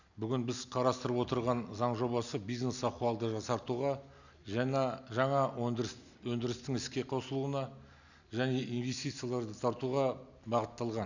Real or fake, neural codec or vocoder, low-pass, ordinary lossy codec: real; none; 7.2 kHz; none